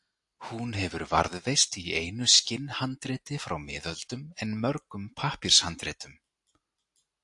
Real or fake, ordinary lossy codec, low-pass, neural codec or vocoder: real; AAC, 64 kbps; 10.8 kHz; none